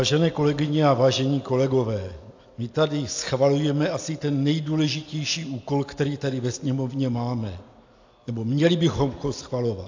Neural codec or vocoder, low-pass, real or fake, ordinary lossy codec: none; 7.2 kHz; real; AAC, 48 kbps